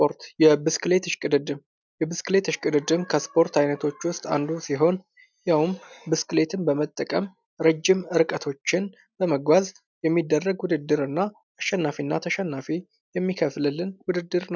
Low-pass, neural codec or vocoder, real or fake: 7.2 kHz; none; real